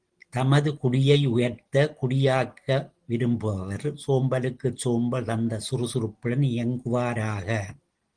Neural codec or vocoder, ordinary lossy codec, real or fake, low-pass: none; Opus, 16 kbps; real; 9.9 kHz